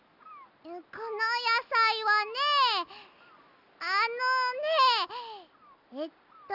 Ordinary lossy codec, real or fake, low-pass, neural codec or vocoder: none; real; 5.4 kHz; none